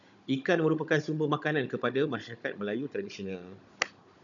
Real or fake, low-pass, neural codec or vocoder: fake; 7.2 kHz; codec, 16 kHz, 16 kbps, FunCodec, trained on Chinese and English, 50 frames a second